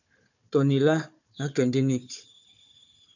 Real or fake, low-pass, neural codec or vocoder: fake; 7.2 kHz; codec, 16 kHz, 4 kbps, FunCodec, trained on Chinese and English, 50 frames a second